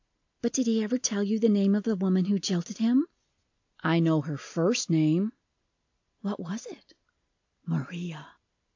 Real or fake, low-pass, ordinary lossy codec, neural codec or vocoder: real; 7.2 kHz; AAC, 48 kbps; none